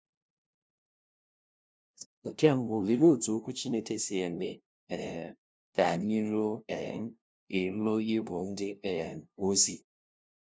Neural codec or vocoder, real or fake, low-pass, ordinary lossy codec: codec, 16 kHz, 0.5 kbps, FunCodec, trained on LibriTTS, 25 frames a second; fake; none; none